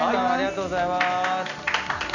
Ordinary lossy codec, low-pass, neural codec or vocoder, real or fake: none; 7.2 kHz; none; real